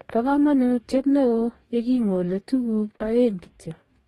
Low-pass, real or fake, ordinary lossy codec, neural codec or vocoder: 19.8 kHz; fake; AAC, 32 kbps; codec, 44.1 kHz, 2.6 kbps, DAC